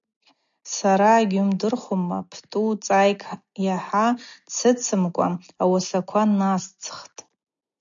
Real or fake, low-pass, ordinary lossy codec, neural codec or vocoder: real; 7.2 kHz; MP3, 64 kbps; none